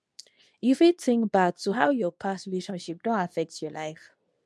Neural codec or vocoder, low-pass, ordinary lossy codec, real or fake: codec, 24 kHz, 0.9 kbps, WavTokenizer, medium speech release version 2; none; none; fake